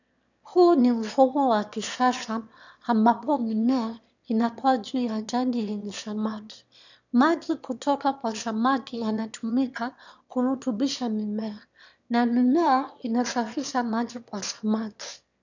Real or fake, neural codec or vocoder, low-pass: fake; autoencoder, 22.05 kHz, a latent of 192 numbers a frame, VITS, trained on one speaker; 7.2 kHz